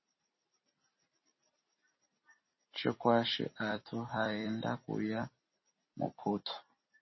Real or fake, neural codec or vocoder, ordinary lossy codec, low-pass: fake; vocoder, 44.1 kHz, 128 mel bands every 256 samples, BigVGAN v2; MP3, 24 kbps; 7.2 kHz